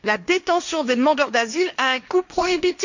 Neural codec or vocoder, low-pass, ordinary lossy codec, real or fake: codec, 16 kHz, 1.1 kbps, Voila-Tokenizer; 7.2 kHz; none; fake